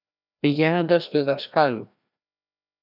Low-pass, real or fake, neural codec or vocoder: 5.4 kHz; fake; codec, 16 kHz, 1 kbps, FreqCodec, larger model